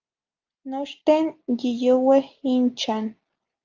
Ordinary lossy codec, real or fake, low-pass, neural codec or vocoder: Opus, 24 kbps; real; 7.2 kHz; none